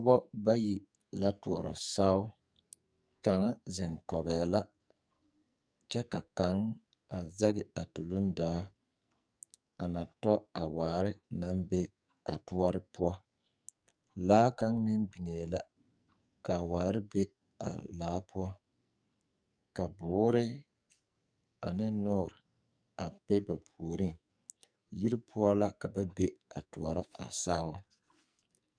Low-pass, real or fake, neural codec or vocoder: 9.9 kHz; fake; codec, 44.1 kHz, 2.6 kbps, SNAC